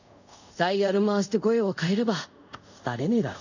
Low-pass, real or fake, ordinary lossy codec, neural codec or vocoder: 7.2 kHz; fake; none; codec, 24 kHz, 0.5 kbps, DualCodec